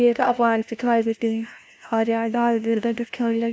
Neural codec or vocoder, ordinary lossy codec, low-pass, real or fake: codec, 16 kHz, 0.5 kbps, FunCodec, trained on LibriTTS, 25 frames a second; none; none; fake